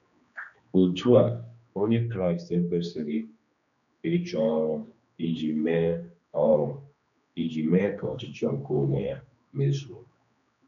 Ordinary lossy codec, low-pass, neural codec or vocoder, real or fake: MP3, 96 kbps; 7.2 kHz; codec, 16 kHz, 2 kbps, X-Codec, HuBERT features, trained on general audio; fake